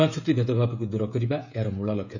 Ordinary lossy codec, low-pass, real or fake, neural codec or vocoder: none; 7.2 kHz; fake; codec, 16 kHz, 16 kbps, FreqCodec, smaller model